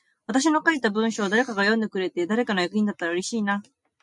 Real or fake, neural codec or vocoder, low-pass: real; none; 10.8 kHz